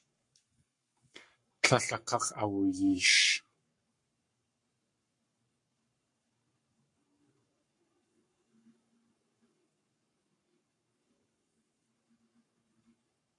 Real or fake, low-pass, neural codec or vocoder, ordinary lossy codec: fake; 10.8 kHz; codec, 44.1 kHz, 7.8 kbps, Pupu-Codec; MP3, 48 kbps